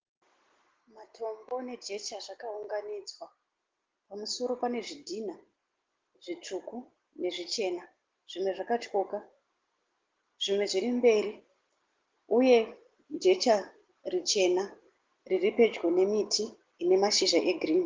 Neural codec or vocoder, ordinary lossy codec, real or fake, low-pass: none; Opus, 16 kbps; real; 7.2 kHz